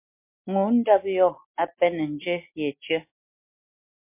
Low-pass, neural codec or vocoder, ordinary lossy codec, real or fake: 3.6 kHz; none; MP3, 24 kbps; real